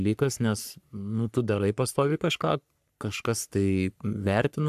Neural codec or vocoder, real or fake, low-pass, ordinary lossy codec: codec, 44.1 kHz, 3.4 kbps, Pupu-Codec; fake; 14.4 kHz; AAC, 96 kbps